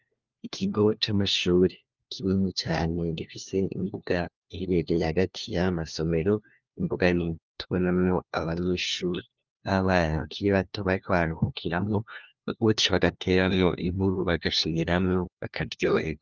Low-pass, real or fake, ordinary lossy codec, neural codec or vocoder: 7.2 kHz; fake; Opus, 32 kbps; codec, 16 kHz, 1 kbps, FunCodec, trained on LibriTTS, 50 frames a second